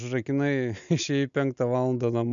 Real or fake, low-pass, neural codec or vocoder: real; 7.2 kHz; none